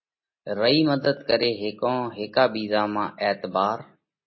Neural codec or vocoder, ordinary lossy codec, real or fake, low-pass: none; MP3, 24 kbps; real; 7.2 kHz